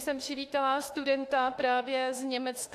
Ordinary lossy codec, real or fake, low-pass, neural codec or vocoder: AAC, 64 kbps; fake; 14.4 kHz; autoencoder, 48 kHz, 32 numbers a frame, DAC-VAE, trained on Japanese speech